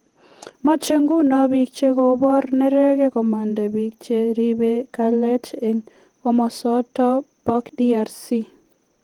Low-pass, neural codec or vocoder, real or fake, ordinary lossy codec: 19.8 kHz; vocoder, 48 kHz, 128 mel bands, Vocos; fake; Opus, 24 kbps